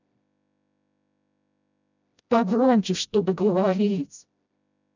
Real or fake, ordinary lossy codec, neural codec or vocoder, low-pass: fake; none; codec, 16 kHz, 0.5 kbps, FreqCodec, smaller model; 7.2 kHz